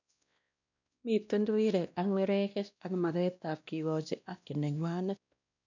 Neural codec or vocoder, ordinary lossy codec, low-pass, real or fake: codec, 16 kHz, 1 kbps, X-Codec, WavLM features, trained on Multilingual LibriSpeech; none; 7.2 kHz; fake